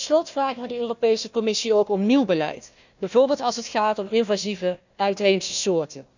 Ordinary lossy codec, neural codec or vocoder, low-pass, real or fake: none; codec, 16 kHz, 1 kbps, FunCodec, trained on Chinese and English, 50 frames a second; 7.2 kHz; fake